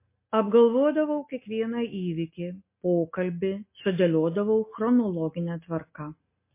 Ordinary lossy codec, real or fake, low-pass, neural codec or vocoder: MP3, 24 kbps; real; 3.6 kHz; none